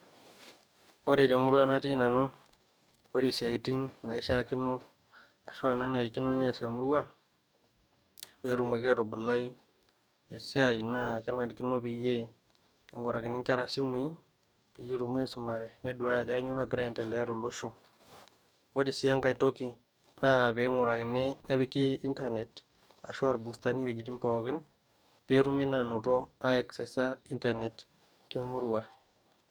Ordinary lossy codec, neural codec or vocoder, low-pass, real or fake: none; codec, 44.1 kHz, 2.6 kbps, DAC; none; fake